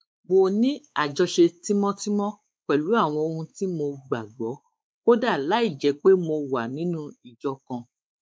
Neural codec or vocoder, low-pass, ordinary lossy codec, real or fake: codec, 16 kHz, 4 kbps, X-Codec, WavLM features, trained on Multilingual LibriSpeech; none; none; fake